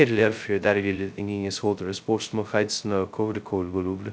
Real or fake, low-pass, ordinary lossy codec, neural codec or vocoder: fake; none; none; codec, 16 kHz, 0.2 kbps, FocalCodec